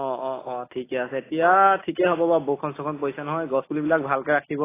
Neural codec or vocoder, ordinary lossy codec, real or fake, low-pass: none; AAC, 16 kbps; real; 3.6 kHz